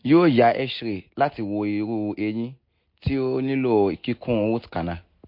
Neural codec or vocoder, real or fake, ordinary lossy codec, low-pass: none; real; MP3, 32 kbps; 5.4 kHz